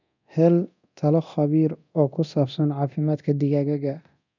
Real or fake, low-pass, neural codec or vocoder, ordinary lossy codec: fake; 7.2 kHz; codec, 24 kHz, 0.9 kbps, DualCodec; none